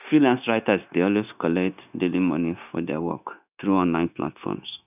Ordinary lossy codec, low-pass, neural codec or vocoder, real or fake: none; 3.6 kHz; codec, 16 kHz, 0.9 kbps, LongCat-Audio-Codec; fake